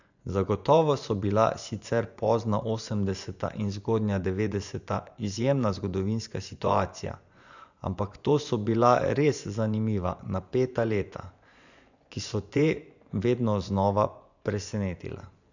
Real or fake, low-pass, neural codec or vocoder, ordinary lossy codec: fake; 7.2 kHz; vocoder, 24 kHz, 100 mel bands, Vocos; none